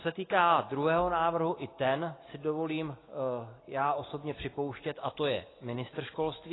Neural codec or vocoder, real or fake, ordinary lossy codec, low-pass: none; real; AAC, 16 kbps; 7.2 kHz